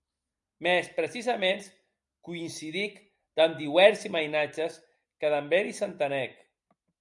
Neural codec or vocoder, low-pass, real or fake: none; 10.8 kHz; real